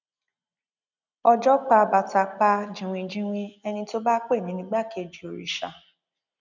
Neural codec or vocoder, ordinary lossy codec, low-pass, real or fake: none; none; 7.2 kHz; real